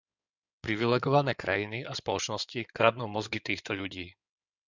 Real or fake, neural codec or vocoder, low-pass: fake; codec, 16 kHz in and 24 kHz out, 2.2 kbps, FireRedTTS-2 codec; 7.2 kHz